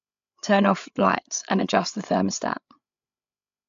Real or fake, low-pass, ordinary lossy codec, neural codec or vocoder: fake; 7.2 kHz; AAC, 64 kbps; codec, 16 kHz, 8 kbps, FreqCodec, larger model